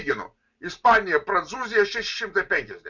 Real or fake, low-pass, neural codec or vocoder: real; 7.2 kHz; none